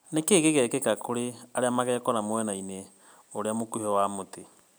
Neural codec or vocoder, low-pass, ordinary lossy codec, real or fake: none; none; none; real